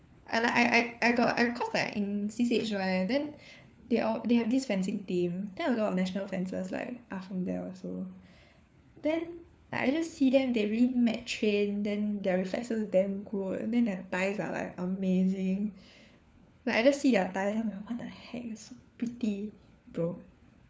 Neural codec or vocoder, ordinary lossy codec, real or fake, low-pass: codec, 16 kHz, 4 kbps, FunCodec, trained on LibriTTS, 50 frames a second; none; fake; none